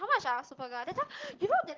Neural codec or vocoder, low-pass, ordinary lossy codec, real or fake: none; 7.2 kHz; Opus, 16 kbps; real